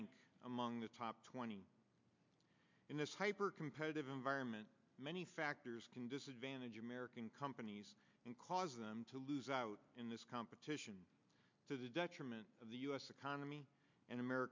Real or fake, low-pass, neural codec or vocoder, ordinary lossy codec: real; 7.2 kHz; none; MP3, 64 kbps